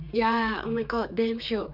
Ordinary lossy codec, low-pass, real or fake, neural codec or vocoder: none; 5.4 kHz; fake; codec, 16 kHz, 4 kbps, X-Codec, HuBERT features, trained on general audio